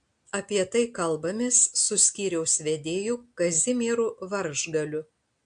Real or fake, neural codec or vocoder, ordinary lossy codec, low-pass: real; none; AAC, 64 kbps; 9.9 kHz